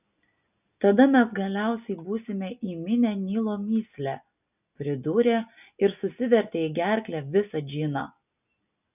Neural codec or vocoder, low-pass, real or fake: none; 3.6 kHz; real